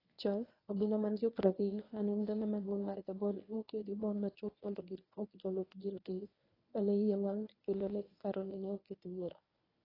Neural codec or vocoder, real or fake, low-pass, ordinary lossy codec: codec, 24 kHz, 0.9 kbps, WavTokenizer, medium speech release version 1; fake; 5.4 kHz; AAC, 24 kbps